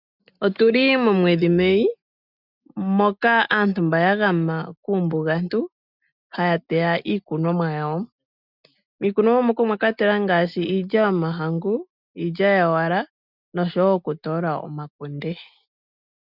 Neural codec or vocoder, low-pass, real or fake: none; 5.4 kHz; real